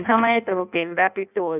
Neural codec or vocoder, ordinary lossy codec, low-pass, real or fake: codec, 16 kHz in and 24 kHz out, 0.6 kbps, FireRedTTS-2 codec; none; 3.6 kHz; fake